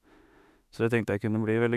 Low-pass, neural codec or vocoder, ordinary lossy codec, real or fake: 14.4 kHz; autoencoder, 48 kHz, 32 numbers a frame, DAC-VAE, trained on Japanese speech; none; fake